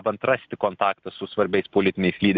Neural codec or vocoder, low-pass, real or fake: none; 7.2 kHz; real